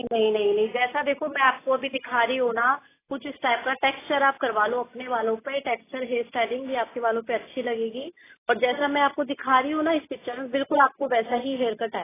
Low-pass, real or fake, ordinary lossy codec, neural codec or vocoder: 3.6 kHz; real; AAC, 16 kbps; none